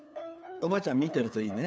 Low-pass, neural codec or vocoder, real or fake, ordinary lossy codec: none; codec, 16 kHz, 8 kbps, FunCodec, trained on LibriTTS, 25 frames a second; fake; none